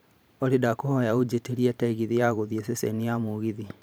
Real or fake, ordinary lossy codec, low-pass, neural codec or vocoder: fake; none; none; vocoder, 44.1 kHz, 128 mel bands every 512 samples, BigVGAN v2